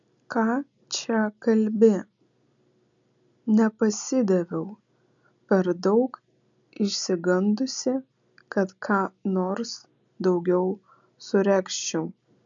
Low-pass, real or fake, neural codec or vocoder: 7.2 kHz; real; none